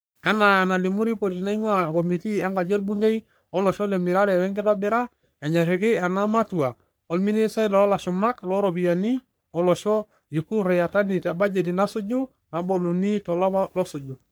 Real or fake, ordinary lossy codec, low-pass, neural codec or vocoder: fake; none; none; codec, 44.1 kHz, 3.4 kbps, Pupu-Codec